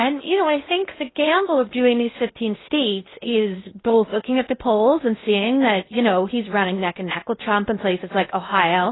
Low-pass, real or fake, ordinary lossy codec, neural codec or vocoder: 7.2 kHz; fake; AAC, 16 kbps; codec, 16 kHz in and 24 kHz out, 0.6 kbps, FocalCodec, streaming, 2048 codes